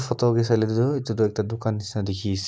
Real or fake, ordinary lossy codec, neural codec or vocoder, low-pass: real; none; none; none